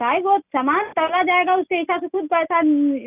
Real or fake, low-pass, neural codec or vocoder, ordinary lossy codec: real; 3.6 kHz; none; none